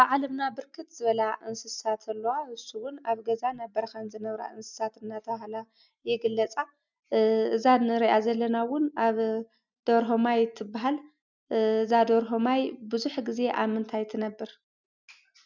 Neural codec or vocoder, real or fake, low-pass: none; real; 7.2 kHz